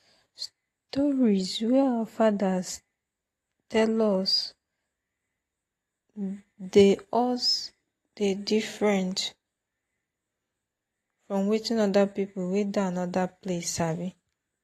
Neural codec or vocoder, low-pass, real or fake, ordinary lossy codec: none; 14.4 kHz; real; AAC, 48 kbps